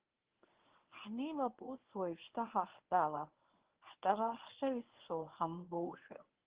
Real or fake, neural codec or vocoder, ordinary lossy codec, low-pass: fake; codec, 24 kHz, 0.9 kbps, WavTokenizer, medium speech release version 1; Opus, 16 kbps; 3.6 kHz